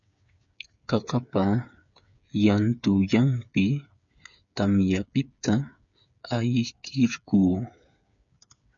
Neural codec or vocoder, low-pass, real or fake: codec, 16 kHz, 8 kbps, FreqCodec, smaller model; 7.2 kHz; fake